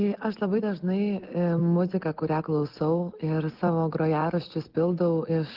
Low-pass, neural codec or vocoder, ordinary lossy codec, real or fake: 5.4 kHz; none; Opus, 16 kbps; real